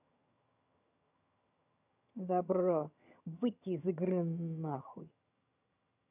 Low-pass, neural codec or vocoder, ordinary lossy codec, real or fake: 3.6 kHz; vocoder, 22.05 kHz, 80 mel bands, HiFi-GAN; none; fake